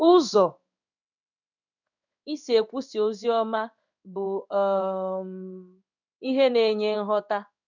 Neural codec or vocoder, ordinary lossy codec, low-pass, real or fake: codec, 16 kHz in and 24 kHz out, 1 kbps, XY-Tokenizer; none; 7.2 kHz; fake